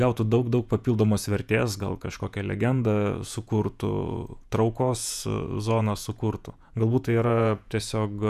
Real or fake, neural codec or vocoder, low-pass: fake; vocoder, 48 kHz, 128 mel bands, Vocos; 14.4 kHz